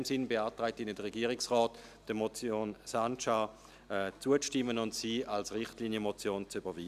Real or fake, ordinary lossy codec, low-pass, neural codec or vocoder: real; none; 14.4 kHz; none